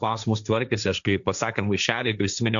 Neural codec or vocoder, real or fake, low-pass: codec, 16 kHz, 1.1 kbps, Voila-Tokenizer; fake; 7.2 kHz